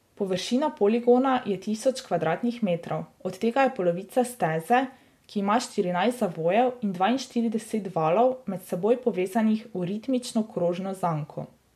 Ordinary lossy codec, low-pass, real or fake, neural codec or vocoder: MP3, 64 kbps; 14.4 kHz; real; none